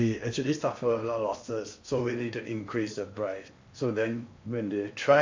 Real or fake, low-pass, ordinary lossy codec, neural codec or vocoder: fake; 7.2 kHz; MP3, 48 kbps; codec, 16 kHz in and 24 kHz out, 0.8 kbps, FocalCodec, streaming, 65536 codes